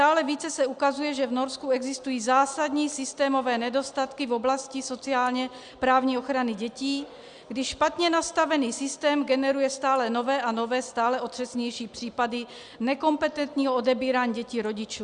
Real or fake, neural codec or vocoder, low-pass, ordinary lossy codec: real; none; 9.9 kHz; Opus, 64 kbps